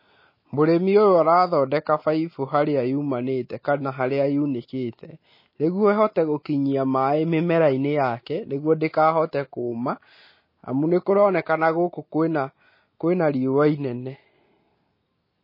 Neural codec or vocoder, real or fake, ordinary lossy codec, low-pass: none; real; MP3, 24 kbps; 5.4 kHz